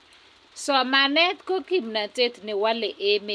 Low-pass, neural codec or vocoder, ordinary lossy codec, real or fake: none; none; none; real